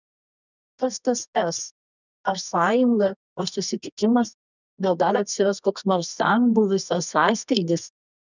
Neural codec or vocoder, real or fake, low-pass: codec, 24 kHz, 0.9 kbps, WavTokenizer, medium music audio release; fake; 7.2 kHz